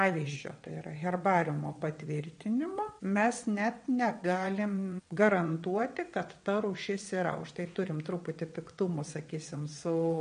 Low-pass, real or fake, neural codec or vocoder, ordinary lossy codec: 9.9 kHz; fake; vocoder, 22.05 kHz, 80 mel bands, WaveNeXt; MP3, 48 kbps